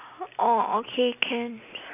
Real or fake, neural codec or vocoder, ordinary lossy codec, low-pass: real; none; none; 3.6 kHz